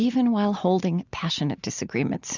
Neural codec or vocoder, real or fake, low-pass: none; real; 7.2 kHz